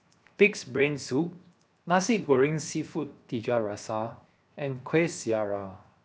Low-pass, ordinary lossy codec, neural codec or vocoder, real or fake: none; none; codec, 16 kHz, 0.7 kbps, FocalCodec; fake